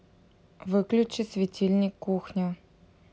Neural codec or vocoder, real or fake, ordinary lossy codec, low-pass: none; real; none; none